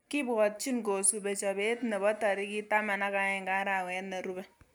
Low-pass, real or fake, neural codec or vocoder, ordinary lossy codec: none; real; none; none